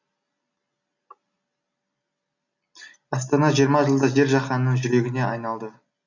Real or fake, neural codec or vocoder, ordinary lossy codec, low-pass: real; none; none; 7.2 kHz